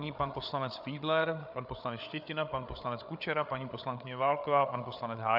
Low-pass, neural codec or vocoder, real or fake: 5.4 kHz; codec, 16 kHz, 8 kbps, FreqCodec, larger model; fake